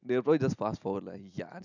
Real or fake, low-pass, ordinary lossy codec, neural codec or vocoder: real; 7.2 kHz; none; none